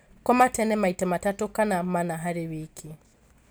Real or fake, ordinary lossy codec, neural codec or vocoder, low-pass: real; none; none; none